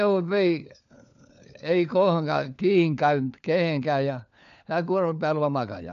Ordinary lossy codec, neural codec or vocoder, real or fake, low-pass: none; codec, 16 kHz, 4 kbps, FunCodec, trained on LibriTTS, 50 frames a second; fake; 7.2 kHz